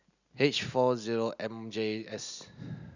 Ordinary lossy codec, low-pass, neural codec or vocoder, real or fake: none; 7.2 kHz; none; real